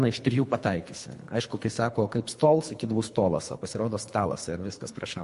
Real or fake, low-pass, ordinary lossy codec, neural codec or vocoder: fake; 10.8 kHz; MP3, 48 kbps; codec, 24 kHz, 3 kbps, HILCodec